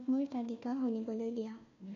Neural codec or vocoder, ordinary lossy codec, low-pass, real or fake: codec, 16 kHz, 1 kbps, FunCodec, trained on Chinese and English, 50 frames a second; none; 7.2 kHz; fake